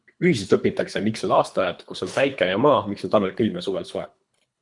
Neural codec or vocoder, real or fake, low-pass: codec, 24 kHz, 3 kbps, HILCodec; fake; 10.8 kHz